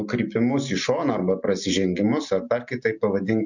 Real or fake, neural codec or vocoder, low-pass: real; none; 7.2 kHz